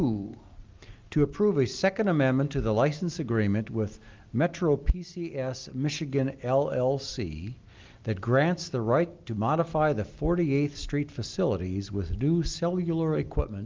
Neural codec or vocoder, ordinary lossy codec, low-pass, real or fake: none; Opus, 24 kbps; 7.2 kHz; real